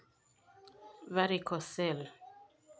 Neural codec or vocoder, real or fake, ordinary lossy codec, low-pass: none; real; none; none